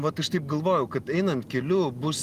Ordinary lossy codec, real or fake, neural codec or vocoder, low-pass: Opus, 24 kbps; real; none; 14.4 kHz